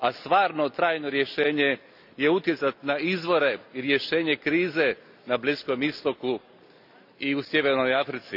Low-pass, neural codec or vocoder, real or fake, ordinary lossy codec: 5.4 kHz; none; real; none